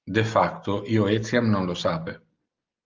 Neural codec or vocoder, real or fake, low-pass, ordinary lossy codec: none; real; 7.2 kHz; Opus, 24 kbps